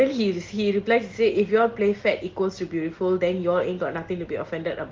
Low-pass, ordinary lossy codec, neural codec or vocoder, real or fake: 7.2 kHz; Opus, 16 kbps; none; real